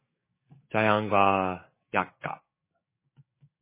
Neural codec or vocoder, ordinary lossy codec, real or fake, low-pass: codec, 16 kHz, 6 kbps, DAC; MP3, 16 kbps; fake; 3.6 kHz